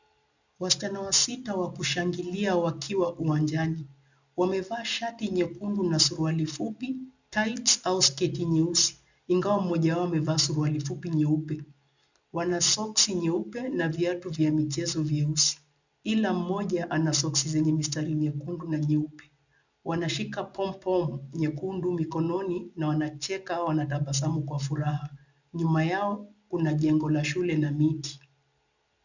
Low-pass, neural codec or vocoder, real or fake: 7.2 kHz; none; real